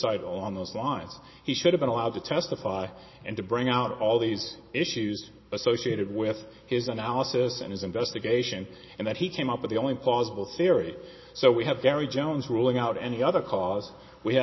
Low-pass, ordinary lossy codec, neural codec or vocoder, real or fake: 7.2 kHz; MP3, 24 kbps; none; real